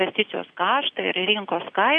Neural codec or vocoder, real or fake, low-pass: none; real; 9.9 kHz